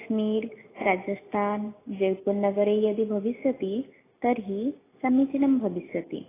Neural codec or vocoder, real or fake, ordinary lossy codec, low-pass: none; real; AAC, 16 kbps; 3.6 kHz